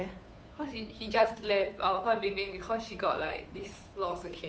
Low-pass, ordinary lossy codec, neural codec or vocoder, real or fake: none; none; codec, 16 kHz, 2 kbps, FunCodec, trained on Chinese and English, 25 frames a second; fake